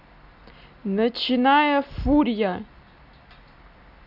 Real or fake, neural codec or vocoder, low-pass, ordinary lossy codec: real; none; 5.4 kHz; none